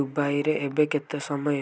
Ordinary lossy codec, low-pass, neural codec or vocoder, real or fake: none; none; none; real